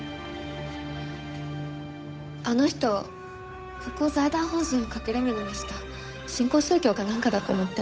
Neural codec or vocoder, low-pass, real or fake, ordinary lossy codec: codec, 16 kHz, 8 kbps, FunCodec, trained on Chinese and English, 25 frames a second; none; fake; none